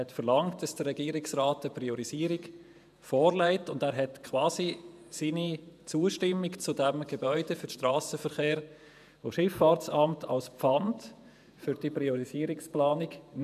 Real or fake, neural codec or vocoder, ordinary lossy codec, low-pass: fake; vocoder, 48 kHz, 128 mel bands, Vocos; none; 14.4 kHz